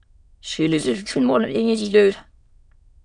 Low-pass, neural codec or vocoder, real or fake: 9.9 kHz; autoencoder, 22.05 kHz, a latent of 192 numbers a frame, VITS, trained on many speakers; fake